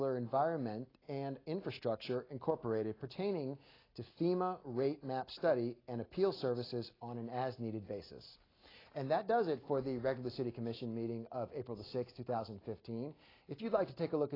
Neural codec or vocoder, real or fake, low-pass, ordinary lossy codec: none; real; 5.4 kHz; AAC, 24 kbps